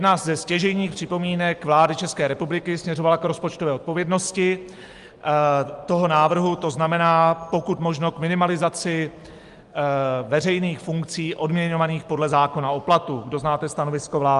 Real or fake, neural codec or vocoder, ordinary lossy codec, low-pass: real; none; Opus, 32 kbps; 10.8 kHz